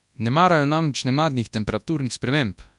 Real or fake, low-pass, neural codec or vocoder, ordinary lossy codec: fake; 10.8 kHz; codec, 24 kHz, 0.9 kbps, WavTokenizer, large speech release; none